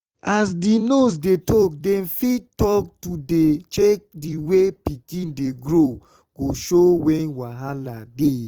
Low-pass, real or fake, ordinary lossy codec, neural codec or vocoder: 19.8 kHz; fake; Opus, 24 kbps; vocoder, 44.1 kHz, 128 mel bands every 256 samples, BigVGAN v2